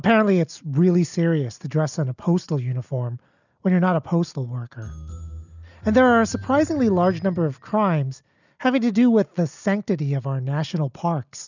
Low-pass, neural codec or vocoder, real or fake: 7.2 kHz; none; real